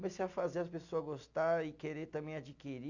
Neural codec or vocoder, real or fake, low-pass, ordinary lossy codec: none; real; 7.2 kHz; none